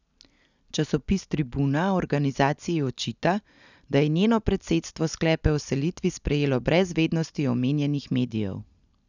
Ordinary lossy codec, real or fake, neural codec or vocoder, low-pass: none; real; none; 7.2 kHz